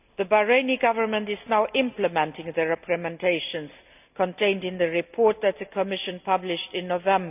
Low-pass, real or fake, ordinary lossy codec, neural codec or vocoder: 3.6 kHz; real; none; none